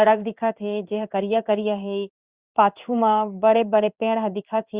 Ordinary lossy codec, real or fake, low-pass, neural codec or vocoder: Opus, 64 kbps; fake; 3.6 kHz; codec, 16 kHz in and 24 kHz out, 1 kbps, XY-Tokenizer